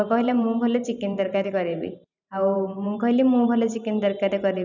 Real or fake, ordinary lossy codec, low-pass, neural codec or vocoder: fake; none; 7.2 kHz; vocoder, 44.1 kHz, 128 mel bands every 512 samples, BigVGAN v2